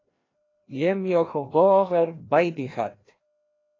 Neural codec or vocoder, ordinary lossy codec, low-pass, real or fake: codec, 16 kHz, 1 kbps, FreqCodec, larger model; AAC, 32 kbps; 7.2 kHz; fake